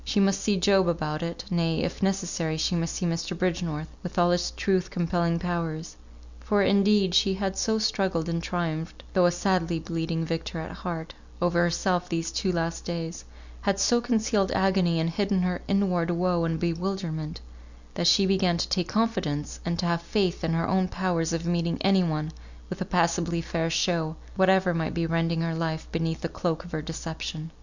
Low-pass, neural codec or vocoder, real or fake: 7.2 kHz; none; real